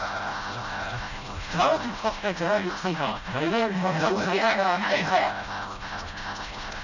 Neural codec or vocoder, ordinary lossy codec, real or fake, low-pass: codec, 16 kHz, 0.5 kbps, FreqCodec, smaller model; none; fake; 7.2 kHz